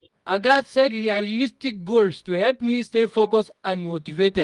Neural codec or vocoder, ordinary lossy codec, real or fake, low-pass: codec, 24 kHz, 0.9 kbps, WavTokenizer, medium music audio release; Opus, 32 kbps; fake; 10.8 kHz